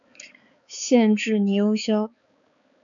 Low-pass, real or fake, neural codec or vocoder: 7.2 kHz; fake; codec, 16 kHz, 4 kbps, X-Codec, HuBERT features, trained on balanced general audio